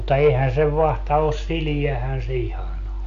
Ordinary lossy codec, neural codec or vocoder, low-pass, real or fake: AAC, 64 kbps; none; 7.2 kHz; real